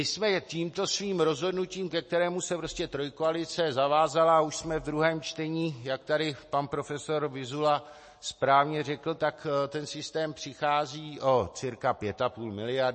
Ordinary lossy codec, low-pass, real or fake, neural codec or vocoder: MP3, 32 kbps; 10.8 kHz; real; none